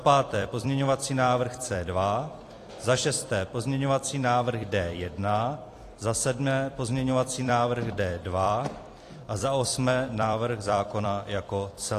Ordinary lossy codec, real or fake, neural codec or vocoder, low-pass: AAC, 48 kbps; fake; vocoder, 44.1 kHz, 128 mel bands every 256 samples, BigVGAN v2; 14.4 kHz